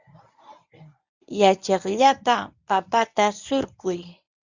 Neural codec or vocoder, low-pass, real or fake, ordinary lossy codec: codec, 24 kHz, 0.9 kbps, WavTokenizer, medium speech release version 1; 7.2 kHz; fake; Opus, 64 kbps